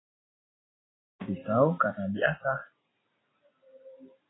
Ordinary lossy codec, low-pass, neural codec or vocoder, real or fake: AAC, 16 kbps; 7.2 kHz; none; real